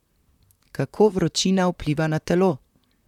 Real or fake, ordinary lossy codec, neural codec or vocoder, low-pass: fake; none; vocoder, 44.1 kHz, 128 mel bands, Pupu-Vocoder; 19.8 kHz